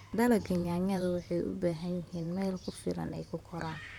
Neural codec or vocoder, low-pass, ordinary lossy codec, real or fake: vocoder, 44.1 kHz, 128 mel bands, Pupu-Vocoder; 19.8 kHz; none; fake